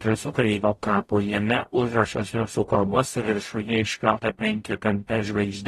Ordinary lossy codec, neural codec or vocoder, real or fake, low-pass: AAC, 32 kbps; codec, 44.1 kHz, 0.9 kbps, DAC; fake; 19.8 kHz